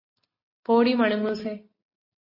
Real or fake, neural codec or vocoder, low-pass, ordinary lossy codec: real; none; 5.4 kHz; MP3, 24 kbps